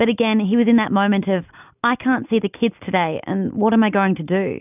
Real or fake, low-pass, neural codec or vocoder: real; 3.6 kHz; none